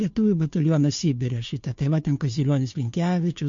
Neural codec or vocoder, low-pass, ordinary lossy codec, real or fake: codec, 16 kHz, 2 kbps, FunCodec, trained on Chinese and English, 25 frames a second; 7.2 kHz; MP3, 48 kbps; fake